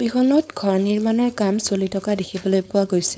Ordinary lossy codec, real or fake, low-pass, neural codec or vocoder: none; fake; none; codec, 16 kHz, 4.8 kbps, FACodec